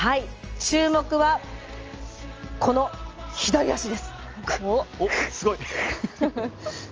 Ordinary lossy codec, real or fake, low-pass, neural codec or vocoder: Opus, 24 kbps; real; 7.2 kHz; none